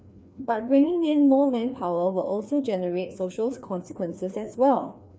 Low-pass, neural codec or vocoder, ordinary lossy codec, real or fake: none; codec, 16 kHz, 2 kbps, FreqCodec, larger model; none; fake